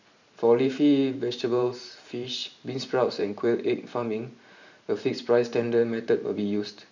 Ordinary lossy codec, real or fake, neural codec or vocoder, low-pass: none; fake; vocoder, 44.1 kHz, 128 mel bands every 512 samples, BigVGAN v2; 7.2 kHz